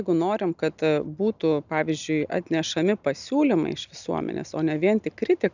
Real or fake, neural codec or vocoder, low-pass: real; none; 7.2 kHz